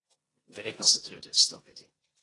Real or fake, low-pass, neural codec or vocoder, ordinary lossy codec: fake; 10.8 kHz; codec, 16 kHz in and 24 kHz out, 0.9 kbps, LongCat-Audio-Codec, four codebook decoder; AAC, 32 kbps